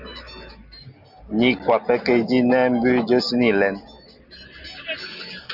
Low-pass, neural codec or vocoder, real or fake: 5.4 kHz; none; real